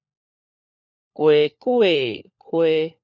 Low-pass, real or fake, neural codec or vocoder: 7.2 kHz; fake; codec, 16 kHz, 4 kbps, FunCodec, trained on LibriTTS, 50 frames a second